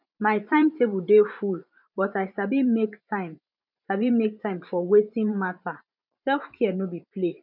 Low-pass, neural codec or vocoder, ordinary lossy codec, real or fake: 5.4 kHz; vocoder, 44.1 kHz, 128 mel bands every 512 samples, BigVGAN v2; none; fake